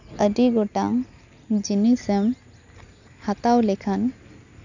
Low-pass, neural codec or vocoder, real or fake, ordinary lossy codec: 7.2 kHz; none; real; none